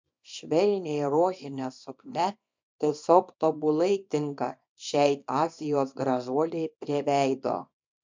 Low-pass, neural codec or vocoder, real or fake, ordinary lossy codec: 7.2 kHz; codec, 24 kHz, 0.9 kbps, WavTokenizer, small release; fake; MP3, 64 kbps